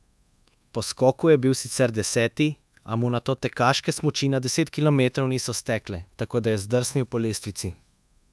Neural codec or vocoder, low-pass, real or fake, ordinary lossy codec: codec, 24 kHz, 1.2 kbps, DualCodec; none; fake; none